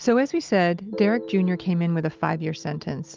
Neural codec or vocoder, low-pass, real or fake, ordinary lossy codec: none; 7.2 kHz; real; Opus, 24 kbps